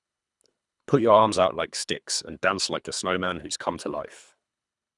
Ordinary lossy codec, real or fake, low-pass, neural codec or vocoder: none; fake; 10.8 kHz; codec, 24 kHz, 3 kbps, HILCodec